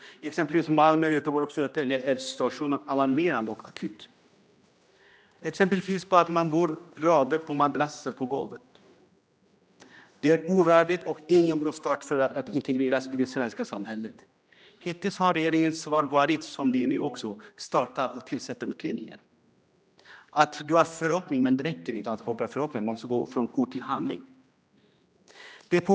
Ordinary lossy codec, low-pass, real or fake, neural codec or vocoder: none; none; fake; codec, 16 kHz, 1 kbps, X-Codec, HuBERT features, trained on general audio